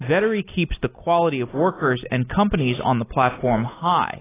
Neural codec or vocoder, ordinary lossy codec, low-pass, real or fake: none; AAC, 16 kbps; 3.6 kHz; real